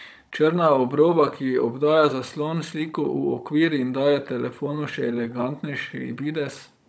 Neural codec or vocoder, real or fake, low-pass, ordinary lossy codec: codec, 16 kHz, 16 kbps, FunCodec, trained on Chinese and English, 50 frames a second; fake; none; none